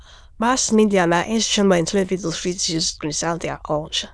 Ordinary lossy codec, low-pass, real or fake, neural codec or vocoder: none; none; fake; autoencoder, 22.05 kHz, a latent of 192 numbers a frame, VITS, trained on many speakers